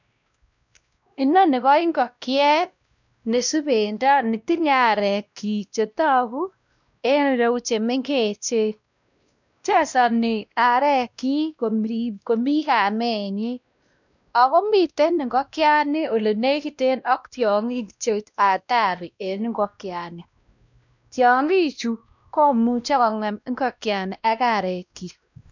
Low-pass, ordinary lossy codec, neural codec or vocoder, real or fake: 7.2 kHz; none; codec, 16 kHz, 1 kbps, X-Codec, WavLM features, trained on Multilingual LibriSpeech; fake